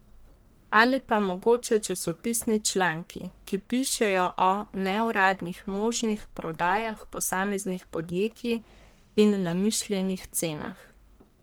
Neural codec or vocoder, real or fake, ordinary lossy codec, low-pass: codec, 44.1 kHz, 1.7 kbps, Pupu-Codec; fake; none; none